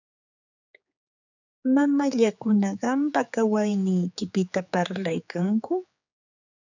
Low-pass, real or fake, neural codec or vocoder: 7.2 kHz; fake; codec, 16 kHz, 4 kbps, X-Codec, HuBERT features, trained on general audio